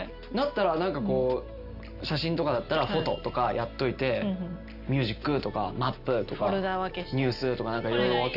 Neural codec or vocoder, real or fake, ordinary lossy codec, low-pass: none; real; none; 5.4 kHz